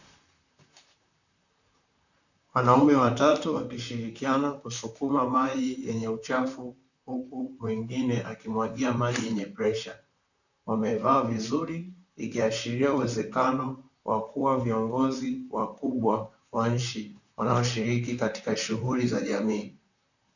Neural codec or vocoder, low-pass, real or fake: vocoder, 44.1 kHz, 128 mel bands, Pupu-Vocoder; 7.2 kHz; fake